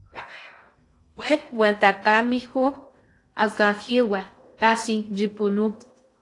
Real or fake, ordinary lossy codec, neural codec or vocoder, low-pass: fake; AAC, 48 kbps; codec, 16 kHz in and 24 kHz out, 0.6 kbps, FocalCodec, streaming, 2048 codes; 10.8 kHz